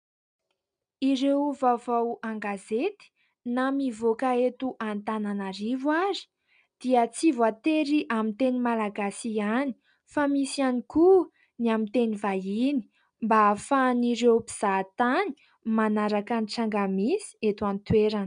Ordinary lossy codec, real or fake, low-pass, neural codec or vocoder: MP3, 96 kbps; real; 9.9 kHz; none